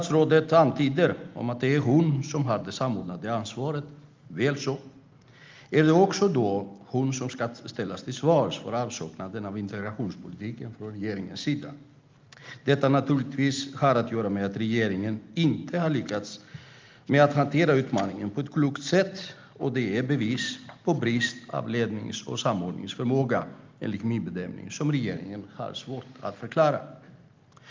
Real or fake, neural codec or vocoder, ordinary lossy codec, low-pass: real; none; Opus, 32 kbps; 7.2 kHz